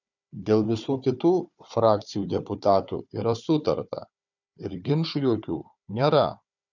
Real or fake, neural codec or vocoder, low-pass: fake; codec, 16 kHz, 4 kbps, FunCodec, trained on Chinese and English, 50 frames a second; 7.2 kHz